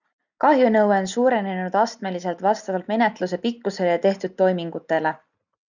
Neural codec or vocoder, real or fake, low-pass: none; real; 7.2 kHz